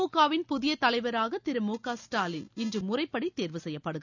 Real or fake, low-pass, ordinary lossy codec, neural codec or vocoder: real; 7.2 kHz; none; none